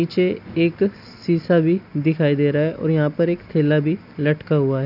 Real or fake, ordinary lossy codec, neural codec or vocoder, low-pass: real; none; none; 5.4 kHz